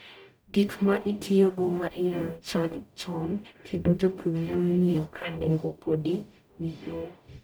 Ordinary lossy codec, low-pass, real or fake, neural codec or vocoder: none; none; fake; codec, 44.1 kHz, 0.9 kbps, DAC